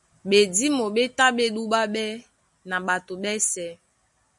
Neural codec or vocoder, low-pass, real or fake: none; 10.8 kHz; real